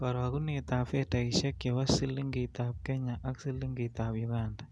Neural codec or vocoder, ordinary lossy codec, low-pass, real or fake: none; none; none; real